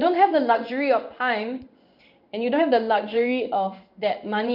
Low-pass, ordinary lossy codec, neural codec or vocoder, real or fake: 5.4 kHz; none; codec, 16 kHz in and 24 kHz out, 1 kbps, XY-Tokenizer; fake